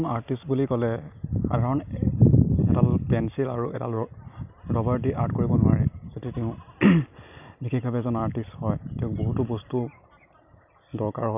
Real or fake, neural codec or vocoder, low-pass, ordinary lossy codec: real; none; 3.6 kHz; none